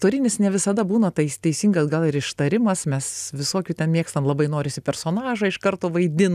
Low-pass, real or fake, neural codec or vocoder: 14.4 kHz; real; none